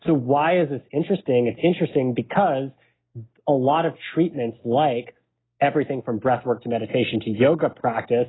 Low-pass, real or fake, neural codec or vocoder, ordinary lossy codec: 7.2 kHz; real; none; AAC, 16 kbps